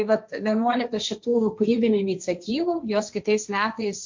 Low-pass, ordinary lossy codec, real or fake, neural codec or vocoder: 7.2 kHz; MP3, 64 kbps; fake; codec, 16 kHz, 1.1 kbps, Voila-Tokenizer